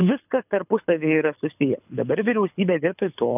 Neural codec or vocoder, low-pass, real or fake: codec, 24 kHz, 6 kbps, HILCodec; 3.6 kHz; fake